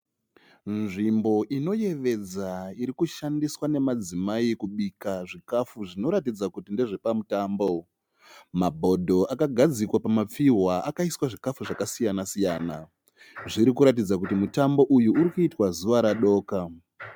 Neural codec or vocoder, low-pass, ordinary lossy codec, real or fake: none; 19.8 kHz; MP3, 96 kbps; real